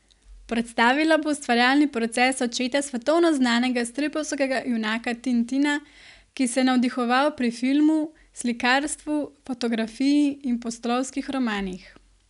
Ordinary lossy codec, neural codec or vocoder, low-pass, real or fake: none; none; 10.8 kHz; real